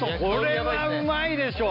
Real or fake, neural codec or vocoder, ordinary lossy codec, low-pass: real; none; none; 5.4 kHz